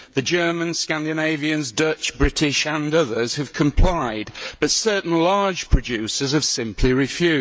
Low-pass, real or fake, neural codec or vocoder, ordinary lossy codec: none; fake; codec, 16 kHz, 16 kbps, FreqCodec, smaller model; none